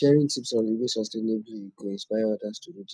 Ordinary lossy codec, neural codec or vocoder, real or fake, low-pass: none; none; real; none